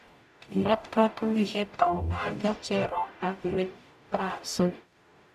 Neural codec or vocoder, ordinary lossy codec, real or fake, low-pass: codec, 44.1 kHz, 0.9 kbps, DAC; MP3, 96 kbps; fake; 14.4 kHz